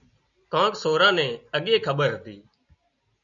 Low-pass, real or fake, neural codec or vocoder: 7.2 kHz; real; none